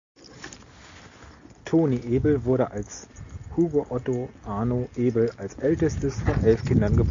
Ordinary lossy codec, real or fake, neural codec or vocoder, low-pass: MP3, 64 kbps; real; none; 7.2 kHz